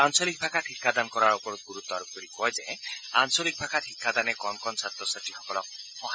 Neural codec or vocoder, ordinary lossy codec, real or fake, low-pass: none; none; real; none